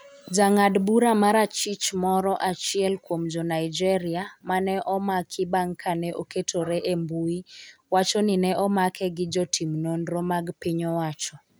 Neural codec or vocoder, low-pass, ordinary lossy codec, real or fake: none; none; none; real